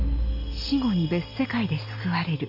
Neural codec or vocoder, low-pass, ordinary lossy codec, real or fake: none; 5.4 kHz; none; real